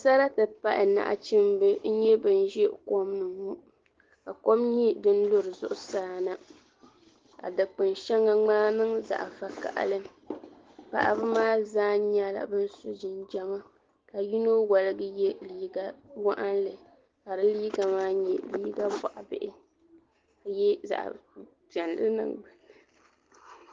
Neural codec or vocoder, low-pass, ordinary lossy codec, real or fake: none; 7.2 kHz; Opus, 16 kbps; real